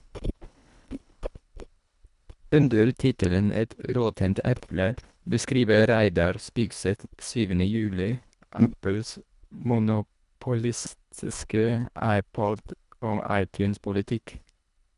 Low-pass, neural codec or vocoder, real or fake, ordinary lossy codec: 10.8 kHz; codec, 24 kHz, 1.5 kbps, HILCodec; fake; none